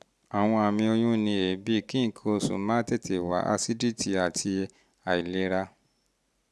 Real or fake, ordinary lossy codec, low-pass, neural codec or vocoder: real; none; none; none